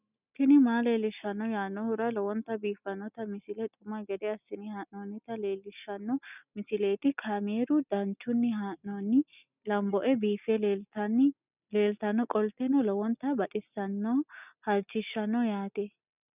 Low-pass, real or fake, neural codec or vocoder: 3.6 kHz; real; none